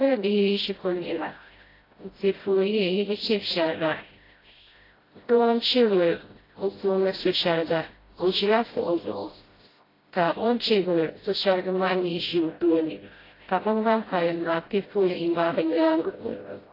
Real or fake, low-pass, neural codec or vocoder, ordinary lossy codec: fake; 5.4 kHz; codec, 16 kHz, 0.5 kbps, FreqCodec, smaller model; AAC, 24 kbps